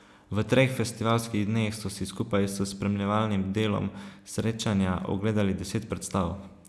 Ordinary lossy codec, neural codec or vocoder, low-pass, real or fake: none; none; none; real